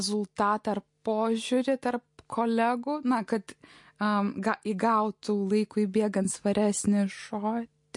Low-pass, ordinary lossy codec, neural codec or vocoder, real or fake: 10.8 kHz; MP3, 48 kbps; none; real